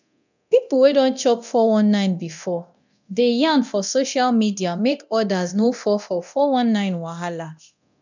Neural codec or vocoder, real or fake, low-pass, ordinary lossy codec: codec, 24 kHz, 0.9 kbps, DualCodec; fake; 7.2 kHz; none